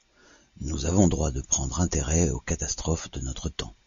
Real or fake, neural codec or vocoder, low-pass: real; none; 7.2 kHz